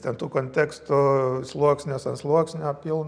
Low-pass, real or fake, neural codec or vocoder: 9.9 kHz; real; none